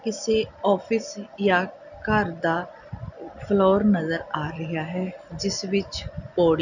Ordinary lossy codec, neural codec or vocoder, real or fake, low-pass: none; none; real; 7.2 kHz